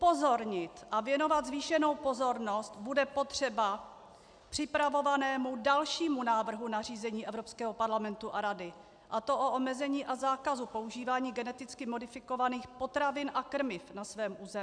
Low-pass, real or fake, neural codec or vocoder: 9.9 kHz; real; none